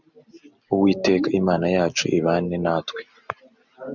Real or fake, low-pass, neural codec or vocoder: real; 7.2 kHz; none